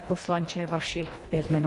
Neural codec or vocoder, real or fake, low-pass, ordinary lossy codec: codec, 24 kHz, 1.5 kbps, HILCodec; fake; 10.8 kHz; AAC, 48 kbps